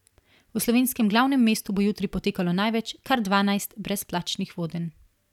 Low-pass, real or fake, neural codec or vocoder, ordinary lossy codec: 19.8 kHz; real; none; none